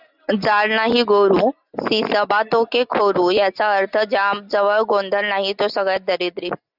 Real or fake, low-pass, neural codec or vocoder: real; 5.4 kHz; none